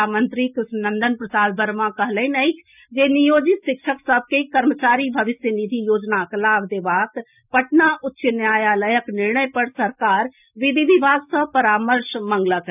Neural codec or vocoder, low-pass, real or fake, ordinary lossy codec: none; 3.6 kHz; real; none